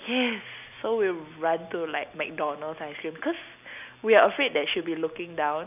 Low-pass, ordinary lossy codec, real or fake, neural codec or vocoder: 3.6 kHz; none; real; none